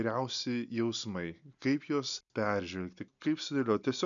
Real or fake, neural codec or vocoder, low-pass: real; none; 7.2 kHz